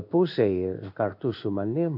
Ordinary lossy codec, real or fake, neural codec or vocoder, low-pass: AAC, 48 kbps; fake; codec, 16 kHz in and 24 kHz out, 1 kbps, XY-Tokenizer; 5.4 kHz